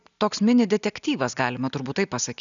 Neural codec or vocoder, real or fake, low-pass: none; real; 7.2 kHz